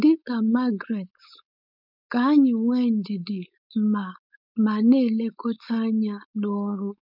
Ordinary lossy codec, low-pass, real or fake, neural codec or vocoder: none; 5.4 kHz; fake; codec, 16 kHz, 4.8 kbps, FACodec